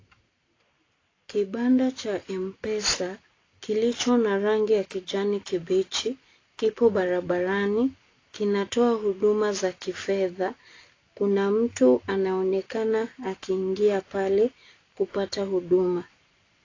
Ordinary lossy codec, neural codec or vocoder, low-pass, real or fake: AAC, 32 kbps; none; 7.2 kHz; real